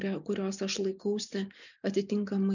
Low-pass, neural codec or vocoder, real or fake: 7.2 kHz; none; real